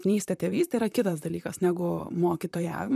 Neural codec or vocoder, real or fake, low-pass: vocoder, 44.1 kHz, 128 mel bands, Pupu-Vocoder; fake; 14.4 kHz